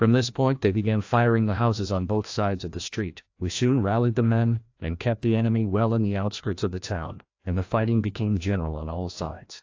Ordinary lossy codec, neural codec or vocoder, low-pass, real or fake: AAC, 48 kbps; codec, 16 kHz, 1 kbps, FreqCodec, larger model; 7.2 kHz; fake